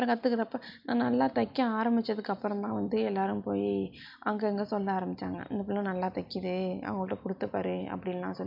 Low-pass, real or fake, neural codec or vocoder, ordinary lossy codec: 5.4 kHz; real; none; MP3, 48 kbps